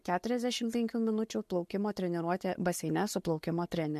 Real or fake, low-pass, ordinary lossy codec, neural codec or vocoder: real; 14.4 kHz; MP3, 64 kbps; none